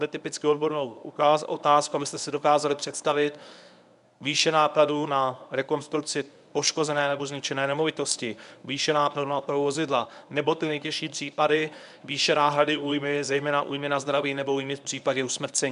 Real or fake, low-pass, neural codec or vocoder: fake; 10.8 kHz; codec, 24 kHz, 0.9 kbps, WavTokenizer, medium speech release version 1